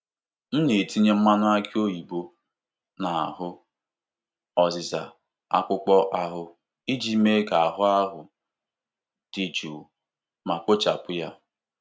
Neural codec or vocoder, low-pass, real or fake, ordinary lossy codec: none; none; real; none